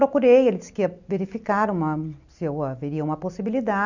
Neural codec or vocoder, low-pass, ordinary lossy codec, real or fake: none; 7.2 kHz; none; real